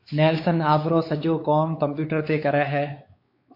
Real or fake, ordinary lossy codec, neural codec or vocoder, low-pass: fake; MP3, 32 kbps; codec, 16 kHz, 4 kbps, X-Codec, WavLM features, trained on Multilingual LibriSpeech; 5.4 kHz